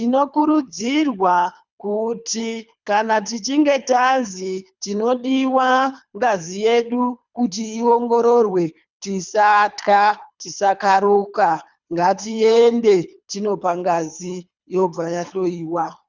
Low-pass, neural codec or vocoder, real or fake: 7.2 kHz; codec, 24 kHz, 3 kbps, HILCodec; fake